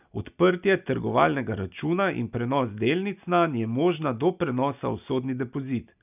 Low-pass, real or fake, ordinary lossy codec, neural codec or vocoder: 3.6 kHz; real; none; none